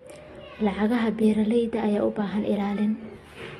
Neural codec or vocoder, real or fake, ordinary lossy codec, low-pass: none; real; AAC, 32 kbps; 19.8 kHz